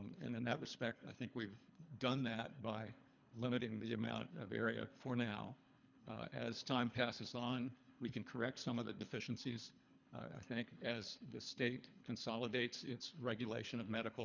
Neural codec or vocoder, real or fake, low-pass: codec, 24 kHz, 3 kbps, HILCodec; fake; 7.2 kHz